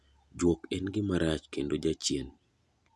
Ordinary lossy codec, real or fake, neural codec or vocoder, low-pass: none; real; none; none